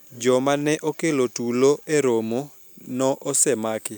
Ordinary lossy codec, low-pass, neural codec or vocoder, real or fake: none; none; none; real